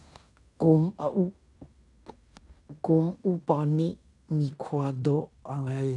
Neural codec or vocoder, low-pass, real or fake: codec, 16 kHz in and 24 kHz out, 0.9 kbps, LongCat-Audio-Codec, fine tuned four codebook decoder; 10.8 kHz; fake